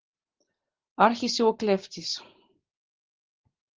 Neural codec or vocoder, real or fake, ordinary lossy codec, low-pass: none; real; Opus, 16 kbps; 7.2 kHz